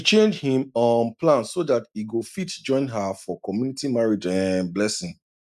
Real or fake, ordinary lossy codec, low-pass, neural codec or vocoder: real; none; 14.4 kHz; none